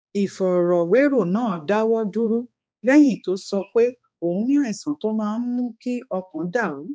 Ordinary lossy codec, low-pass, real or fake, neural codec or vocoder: none; none; fake; codec, 16 kHz, 2 kbps, X-Codec, HuBERT features, trained on balanced general audio